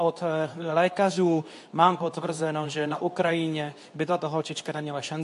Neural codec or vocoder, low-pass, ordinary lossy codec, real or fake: codec, 24 kHz, 0.9 kbps, WavTokenizer, medium speech release version 2; 10.8 kHz; AAC, 64 kbps; fake